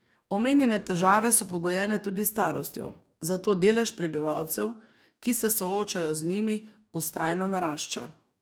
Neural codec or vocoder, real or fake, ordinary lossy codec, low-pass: codec, 44.1 kHz, 2.6 kbps, DAC; fake; none; none